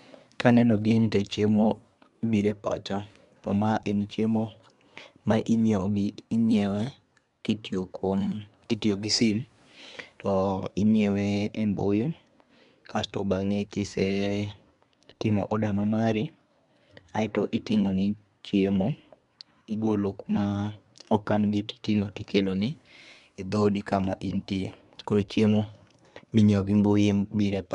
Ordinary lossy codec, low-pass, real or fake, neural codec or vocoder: MP3, 96 kbps; 10.8 kHz; fake; codec, 24 kHz, 1 kbps, SNAC